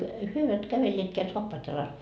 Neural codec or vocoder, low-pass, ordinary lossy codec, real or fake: none; none; none; real